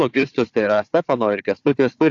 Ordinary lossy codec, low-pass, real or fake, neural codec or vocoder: AAC, 48 kbps; 7.2 kHz; fake; codec, 16 kHz, 4 kbps, FunCodec, trained on Chinese and English, 50 frames a second